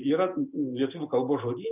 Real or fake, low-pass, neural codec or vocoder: real; 3.6 kHz; none